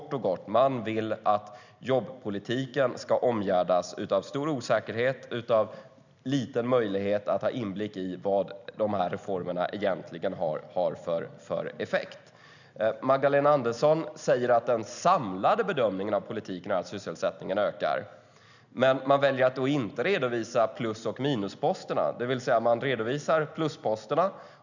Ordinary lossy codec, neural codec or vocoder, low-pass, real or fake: none; none; 7.2 kHz; real